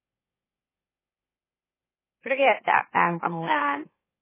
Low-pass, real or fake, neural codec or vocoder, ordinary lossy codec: 3.6 kHz; fake; autoencoder, 44.1 kHz, a latent of 192 numbers a frame, MeloTTS; MP3, 16 kbps